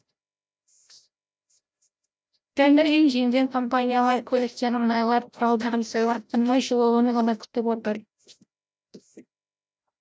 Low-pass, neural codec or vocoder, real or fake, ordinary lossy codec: none; codec, 16 kHz, 0.5 kbps, FreqCodec, larger model; fake; none